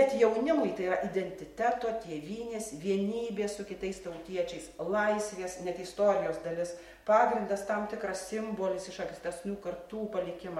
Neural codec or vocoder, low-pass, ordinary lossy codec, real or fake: none; 19.8 kHz; MP3, 64 kbps; real